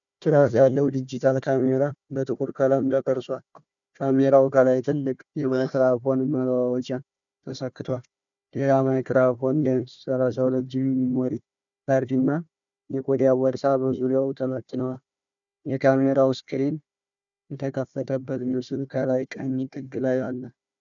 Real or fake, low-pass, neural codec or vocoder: fake; 7.2 kHz; codec, 16 kHz, 1 kbps, FunCodec, trained on Chinese and English, 50 frames a second